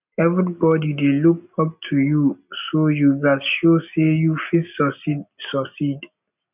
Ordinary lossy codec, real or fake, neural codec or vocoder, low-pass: none; real; none; 3.6 kHz